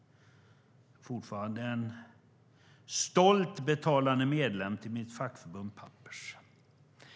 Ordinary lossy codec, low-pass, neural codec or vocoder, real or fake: none; none; none; real